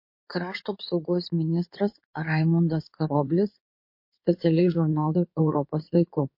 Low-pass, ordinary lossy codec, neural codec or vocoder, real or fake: 5.4 kHz; MP3, 32 kbps; codec, 16 kHz in and 24 kHz out, 2.2 kbps, FireRedTTS-2 codec; fake